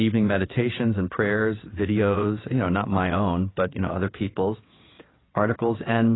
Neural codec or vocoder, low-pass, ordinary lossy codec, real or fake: vocoder, 22.05 kHz, 80 mel bands, WaveNeXt; 7.2 kHz; AAC, 16 kbps; fake